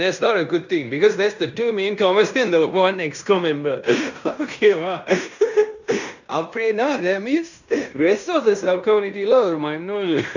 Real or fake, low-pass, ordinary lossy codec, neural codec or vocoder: fake; 7.2 kHz; none; codec, 16 kHz in and 24 kHz out, 0.9 kbps, LongCat-Audio-Codec, fine tuned four codebook decoder